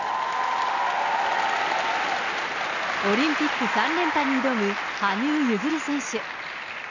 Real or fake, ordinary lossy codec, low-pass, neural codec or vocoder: real; none; 7.2 kHz; none